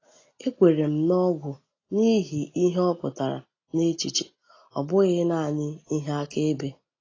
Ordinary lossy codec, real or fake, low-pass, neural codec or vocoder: AAC, 32 kbps; real; 7.2 kHz; none